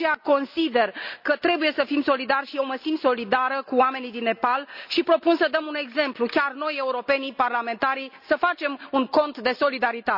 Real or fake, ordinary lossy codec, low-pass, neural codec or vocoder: real; none; 5.4 kHz; none